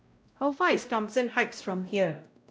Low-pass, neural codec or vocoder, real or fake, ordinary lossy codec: none; codec, 16 kHz, 0.5 kbps, X-Codec, WavLM features, trained on Multilingual LibriSpeech; fake; none